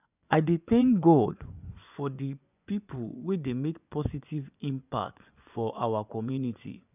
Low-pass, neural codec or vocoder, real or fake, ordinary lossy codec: 3.6 kHz; vocoder, 24 kHz, 100 mel bands, Vocos; fake; none